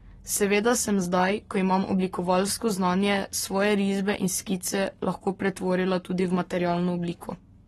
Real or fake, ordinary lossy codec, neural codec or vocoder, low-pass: fake; AAC, 32 kbps; codec, 44.1 kHz, 7.8 kbps, Pupu-Codec; 19.8 kHz